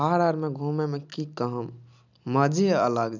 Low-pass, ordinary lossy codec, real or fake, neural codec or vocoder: 7.2 kHz; none; real; none